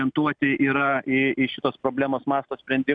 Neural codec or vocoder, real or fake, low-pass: none; real; 9.9 kHz